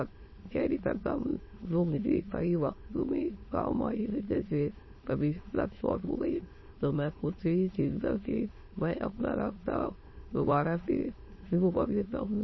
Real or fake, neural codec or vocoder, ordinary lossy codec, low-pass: fake; autoencoder, 22.05 kHz, a latent of 192 numbers a frame, VITS, trained on many speakers; MP3, 24 kbps; 7.2 kHz